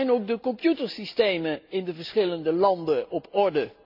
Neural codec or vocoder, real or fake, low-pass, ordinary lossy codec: none; real; 5.4 kHz; none